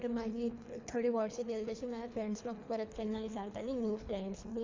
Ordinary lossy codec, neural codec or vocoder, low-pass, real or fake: MP3, 64 kbps; codec, 24 kHz, 3 kbps, HILCodec; 7.2 kHz; fake